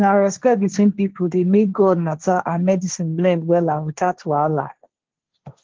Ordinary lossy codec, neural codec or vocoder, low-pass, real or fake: Opus, 16 kbps; codec, 16 kHz, 1.1 kbps, Voila-Tokenizer; 7.2 kHz; fake